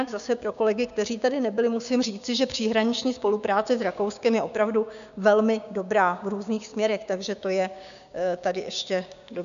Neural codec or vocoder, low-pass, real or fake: codec, 16 kHz, 6 kbps, DAC; 7.2 kHz; fake